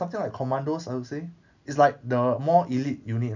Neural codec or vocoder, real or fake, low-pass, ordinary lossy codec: none; real; 7.2 kHz; none